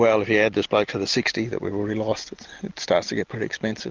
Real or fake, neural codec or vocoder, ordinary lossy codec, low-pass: real; none; Opus, 32 kbps; 7.2 kHz